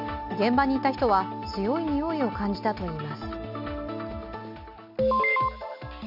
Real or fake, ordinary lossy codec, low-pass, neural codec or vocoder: real; none; 5.4 kHz; none